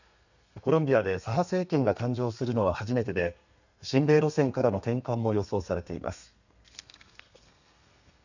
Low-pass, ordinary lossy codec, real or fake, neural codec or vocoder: 7.2 kHz; none; fake; codec, 32 kHz, 1.9 kbps, SNAC